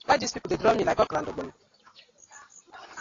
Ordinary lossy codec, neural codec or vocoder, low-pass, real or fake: AAC, 32 kbps; none; 7.2 kHz; real